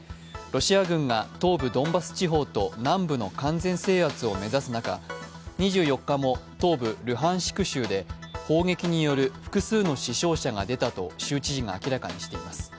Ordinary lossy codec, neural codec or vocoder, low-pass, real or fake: none; none; none; real